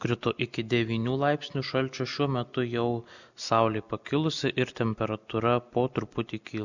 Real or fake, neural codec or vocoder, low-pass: real; none; 7.2 kHz